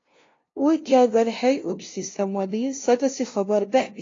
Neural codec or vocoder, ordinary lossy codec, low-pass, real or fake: codec, 16 kHz, 0.5 kbps, FunCodec, trained on LibriTTS, 25 frames a second; AAC, 32 kbps; 7.2 kHz; fake